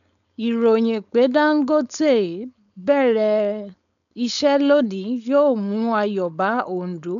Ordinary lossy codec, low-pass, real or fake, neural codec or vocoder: none; 7.2 kHz; fake; codec, 16 kHz, 4.8 kbps, FACodec